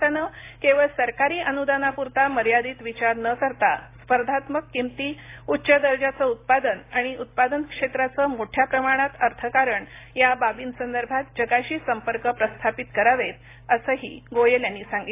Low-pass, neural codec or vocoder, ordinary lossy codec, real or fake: 3.6 kHz; none; AAC, 24 kbps; real